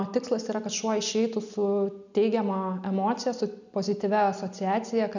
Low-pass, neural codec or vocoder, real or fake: 7.2 kHz; none; real